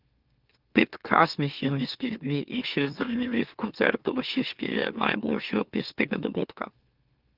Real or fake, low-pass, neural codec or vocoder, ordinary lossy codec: fake; 5.4 kHz; autoencoder, 44.1 kHz, a latent of 192 numbers a frame, MeloTTS; Opus, 24 kbps